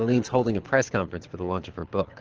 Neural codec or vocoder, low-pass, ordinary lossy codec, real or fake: codec, 24 kHz, 6 kbps, HILCodec; 7.2 kHz; Opus, 16 kbps; fake